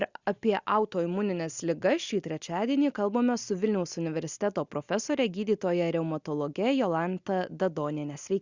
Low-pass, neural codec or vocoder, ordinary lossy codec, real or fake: 7.2 kHz; none; Opus, 64 kbps; real